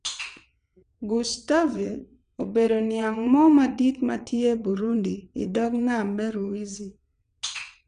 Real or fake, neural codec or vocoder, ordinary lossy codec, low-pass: fake; vocoder, 22.05 kHz, 80 mel bands, WaveNeXt; none; 9.9 kHz